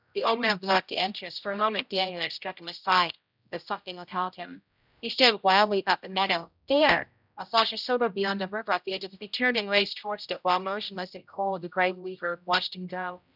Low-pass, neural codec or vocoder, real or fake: 5.4 kHz; codec, 16 kHz, 0.5 kbps, X-Codec, HuBERT features, trained on general audio; fake